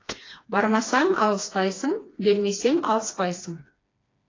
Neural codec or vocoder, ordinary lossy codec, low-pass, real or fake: codec, 16 kHz, 2 kbps, FreqCodec, smaller model; AAC, 32 kbps; 7.2 kHz; fake